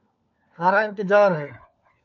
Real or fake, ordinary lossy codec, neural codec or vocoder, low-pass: fake; AAC, 48 kbps; codec, 16 kHz, 4 kbps, FunCodec, trained on LibriTTS, 50 frames a second; 7.2 kHz